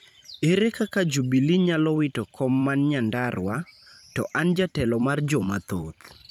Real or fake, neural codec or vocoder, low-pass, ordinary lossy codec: fake; vocoder, 44.1 kHz, 128 mel bands every 512 samples, BigVGAN v2; 19.8 kHz; none